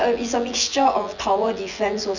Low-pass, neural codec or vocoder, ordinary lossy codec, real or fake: 7.2 kHz; vocoder, 24 kHz, 100 mel bands, Vocos; none; fake